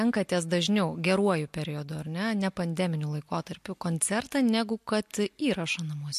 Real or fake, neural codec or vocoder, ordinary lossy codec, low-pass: real; none; MP3, 64 kbps; 14.4 kHz